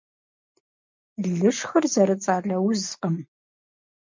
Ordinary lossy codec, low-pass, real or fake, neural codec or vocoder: MP3, 64 kbps; 7.2 kHz; real; none